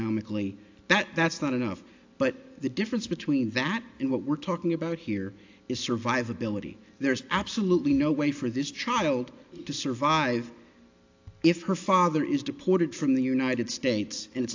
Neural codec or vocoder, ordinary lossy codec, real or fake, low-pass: none; AAC, 48 kbps; real; 7.2 kHz